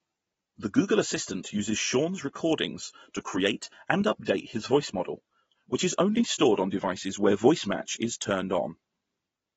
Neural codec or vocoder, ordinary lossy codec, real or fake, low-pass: none; AAC, 24 kbps; real; 19.8 kHz